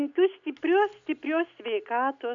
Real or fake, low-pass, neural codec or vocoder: real; 7.2 kHz; none